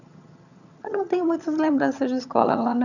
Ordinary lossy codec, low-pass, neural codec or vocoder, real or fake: none; 7.2 kHz; vocoder, 22.05 kHz, 80 mel bands, HiFi-GAN; fake